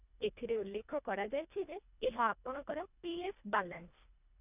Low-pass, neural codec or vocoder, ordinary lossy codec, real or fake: 3.6 kHz; codec, 24 kHz, 1.5 kbps, HILCodec; none; fake